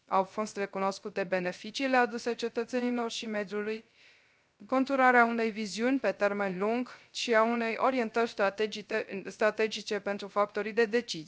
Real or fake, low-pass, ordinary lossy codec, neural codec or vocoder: fake; none; none; codec, 16 kHz, 0.3 kbps, FocalCodec